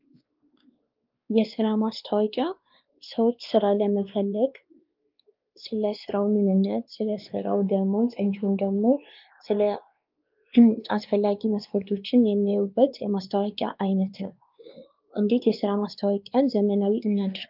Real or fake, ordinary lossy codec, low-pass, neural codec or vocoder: fake; Opus, 24 kbps; 5.4 kHz; codec, 16 kHz, 2 kbps, X-Codec, WavLM features, trained on Multilingual LibriSpeech